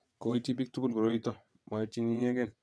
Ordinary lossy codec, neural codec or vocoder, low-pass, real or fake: none; vocoder, 22.05 kHz, 80 mel bands, WaveNeXt; none; fake